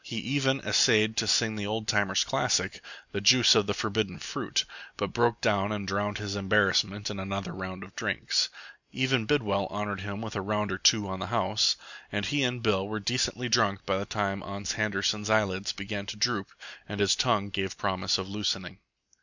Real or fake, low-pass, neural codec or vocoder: real; 7.2 kHz; none